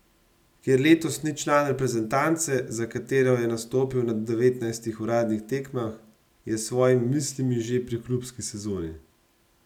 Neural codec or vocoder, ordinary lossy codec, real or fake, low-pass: none; none; real; 19.8 kHz